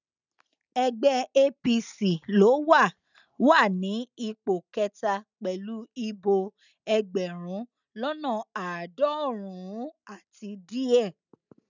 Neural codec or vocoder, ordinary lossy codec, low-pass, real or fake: none; none; 7.2 kHz; real